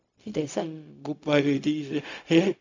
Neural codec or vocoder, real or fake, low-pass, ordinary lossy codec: codec, 16 kHz, 0.4 kbps, LongCat-Audio-Codec; fake; 7.2 kHz; MP3, 64 kbps